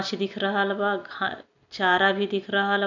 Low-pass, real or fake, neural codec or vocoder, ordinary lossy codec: 7.2 kHz; real; none; none